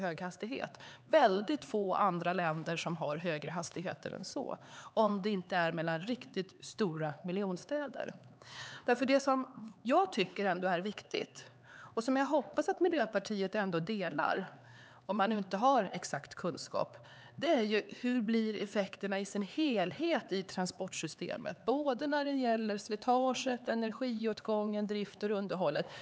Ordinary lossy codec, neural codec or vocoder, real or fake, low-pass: none; codec, 16 kHz, 4 kbps, X-Codec, HuBERT features, trained on LibriSpeech; fake; none